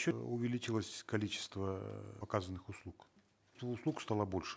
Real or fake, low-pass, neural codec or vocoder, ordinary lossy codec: real; none; none; none